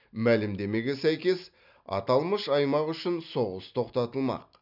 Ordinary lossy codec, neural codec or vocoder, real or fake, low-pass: none; none; real; 5.4 kHz